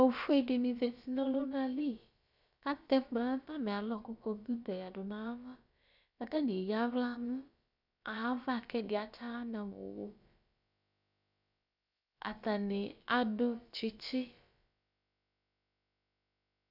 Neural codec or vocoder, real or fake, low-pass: codec, 16 kHz, about 1 kbps, DyCAST, with the encoder's durations; fake; 5.4 kHz